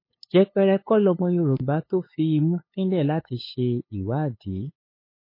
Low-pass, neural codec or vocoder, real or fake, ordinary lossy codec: 5.4 kHz; codec, 16 kHz, 8 kbps, FunCodec, trained on LibriTTS, 25 frames a second; fake; MP3, 24 kbps